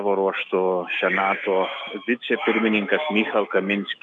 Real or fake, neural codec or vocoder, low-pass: fake; autoencoder, 48 kHz, 128 numbers a frame, DAC-VAE, trained on Japanese speech; 10.8 kHz